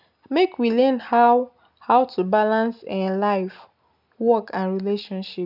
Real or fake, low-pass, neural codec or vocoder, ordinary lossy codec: real; 5.4 kHz; none; none